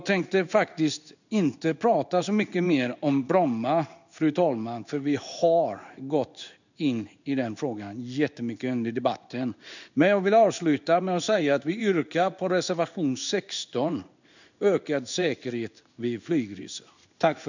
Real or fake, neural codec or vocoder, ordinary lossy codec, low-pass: fake; codec, 16 kHz in and 24 kHz out, 1 kbps, XY-Tokenizer; none; 7.2 kHz